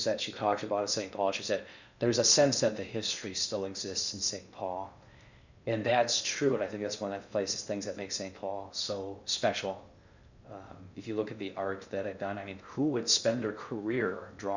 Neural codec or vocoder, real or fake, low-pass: codec, 16 kHz in and 24 kHz out, 0.6 kbps, FocalCodec, streaming, 2048 codes; fake; 7.2 kHz